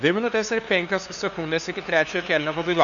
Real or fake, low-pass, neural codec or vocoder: fake; 7.2 kHz; codec, 16 kHz, 2 kbps, FunCodec, trained on LibriTTS, 25 frames a second